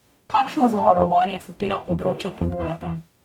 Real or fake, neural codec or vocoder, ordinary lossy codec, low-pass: fake; codec, 44.1 kHz, 0.9 kbps, DAC; MP3, 96 kbps; 19.8 kHz